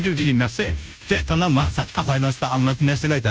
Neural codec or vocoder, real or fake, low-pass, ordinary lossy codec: codec, 16 kHz, 0.5 kbps, FunCodec, trained on Chinese and English, 25 frames a second; fake; none; none